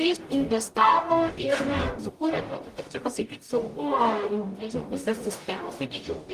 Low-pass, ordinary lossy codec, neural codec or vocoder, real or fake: 14.4 kHz; Opus, 24 kbps; codec, 44.1 kHz, 0.9 kbps, DAC; fake